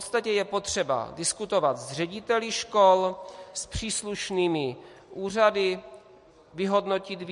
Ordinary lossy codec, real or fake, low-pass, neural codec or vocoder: MP3, 48 kbps; real; 14.4 kHz; none